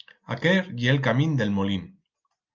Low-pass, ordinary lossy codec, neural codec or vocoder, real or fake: 7.2 kHz; Opus, 24 kbps; none; real